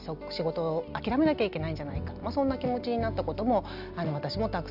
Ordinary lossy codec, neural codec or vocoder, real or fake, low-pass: none; none; real; 5.4 kHz